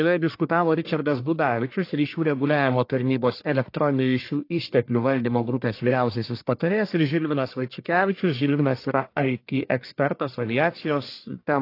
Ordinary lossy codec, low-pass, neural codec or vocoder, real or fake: AAC, 32 kbps; 5.4 kHz; codec, 44.1 kHz, 1.7 kbps, Pupu-Codec; fake